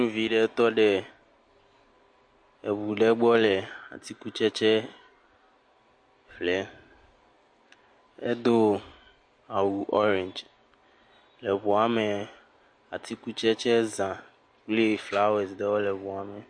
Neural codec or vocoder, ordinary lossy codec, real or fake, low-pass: none; MP3, 48 kbps; real; 9.9 kHz